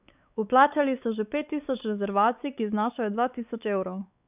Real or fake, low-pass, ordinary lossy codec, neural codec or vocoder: fake; 3.6 kHz; none; codec, 16 kHz, 4 kbps, X-Codec, WavLM features, trained on Multilingual LibriSpeech